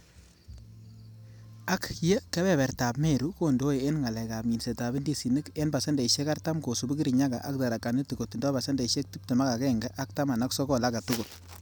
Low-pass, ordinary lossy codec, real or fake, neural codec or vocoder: none; none; real; none